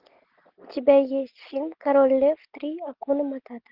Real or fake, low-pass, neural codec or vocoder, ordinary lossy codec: real; 5.4 kHz; none; Opus, 24 kbps